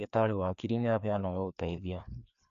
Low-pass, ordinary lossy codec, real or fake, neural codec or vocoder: 7.2 kHz; none; fake; codec, 16 kHz, 2 kbps, FreqCodec, larger model